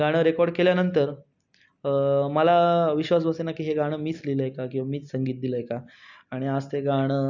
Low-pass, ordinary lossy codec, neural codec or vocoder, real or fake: 7.2 kHz; none; none; real